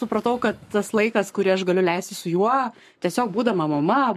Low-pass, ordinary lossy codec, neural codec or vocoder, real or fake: 14.4 kHz; MP3, 64 kbps; codec, 44.1 kHz, 7.8 kbps, Pupu-Codec; fake